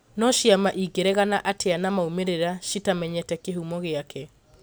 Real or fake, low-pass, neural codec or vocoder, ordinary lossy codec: real; none; none; none